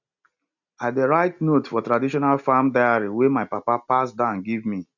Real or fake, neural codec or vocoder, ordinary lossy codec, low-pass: real; none; none; 7.2 kHz